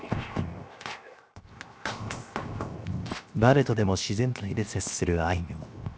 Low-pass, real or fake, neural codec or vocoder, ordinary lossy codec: none; fake; codec, 16 kHz, 0.7 kbps, FocalCodec; none